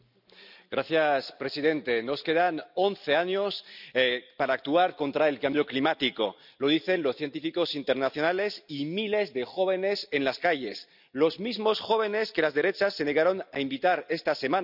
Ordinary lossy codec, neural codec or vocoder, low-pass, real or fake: none; none; 5.4 kHz; real